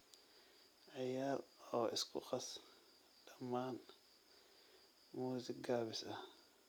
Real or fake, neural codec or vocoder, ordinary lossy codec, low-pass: real; none; none; none